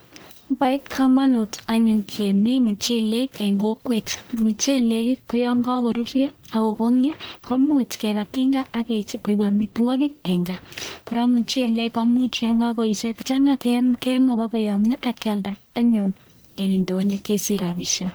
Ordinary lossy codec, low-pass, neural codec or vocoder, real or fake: none; none; codec, 44.1 kHz, 1.7 kbps, Pupu-Codec; fake